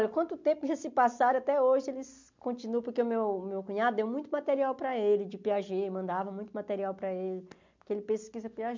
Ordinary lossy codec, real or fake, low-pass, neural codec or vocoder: MP3, 64 kbps; real; 7.2 kHz; none